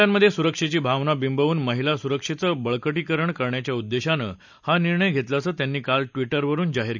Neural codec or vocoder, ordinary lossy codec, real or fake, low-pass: none; none; real; 7.2 kHz